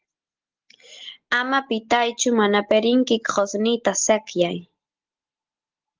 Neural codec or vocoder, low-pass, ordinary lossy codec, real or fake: none; 7.2 kHz; Opus, 16 kbps; real